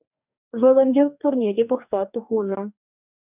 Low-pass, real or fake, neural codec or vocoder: 3.6 kHz; fake; codec, 44.1 kHz, 2.6 kbps, DAC